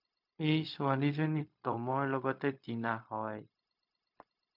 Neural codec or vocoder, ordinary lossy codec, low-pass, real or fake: codec, 16 kHz, 0.4 kbps, LongCat-Audio-Codec; MP3, 48 kbps; 5.4 kHz; fake